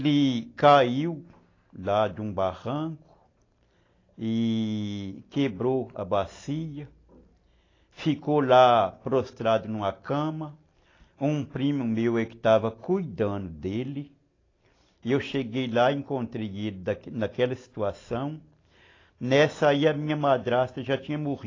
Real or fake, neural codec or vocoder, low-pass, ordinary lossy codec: real; none; 7.2 kHz; AAC, 32 kbps